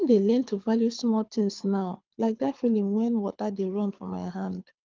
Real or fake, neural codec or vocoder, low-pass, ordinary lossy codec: fake; codec, 24 kHz, 6 kbps, HILCodec; 7.2 kHz; Opus, 24 kbps